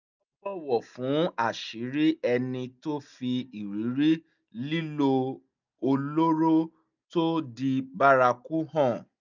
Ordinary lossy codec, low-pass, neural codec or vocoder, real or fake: none; 7.2 kHz; none; real